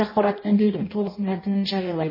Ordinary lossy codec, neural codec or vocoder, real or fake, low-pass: MP3, 24 kbps; codec, 16 kHz in and 24 kHz out, 0.6 kbps, FireRedTTS-2 codec; fake; 5.4 kHz